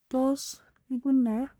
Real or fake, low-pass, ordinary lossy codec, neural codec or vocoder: fake; none; none; codec, 44.1 kHz, 1.7 kbps, Pupu-Codec